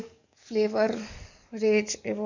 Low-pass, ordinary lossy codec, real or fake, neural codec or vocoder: 7.2 kHz; none; fake; codec, 44.1 kHz, 7.8 kbps, DAC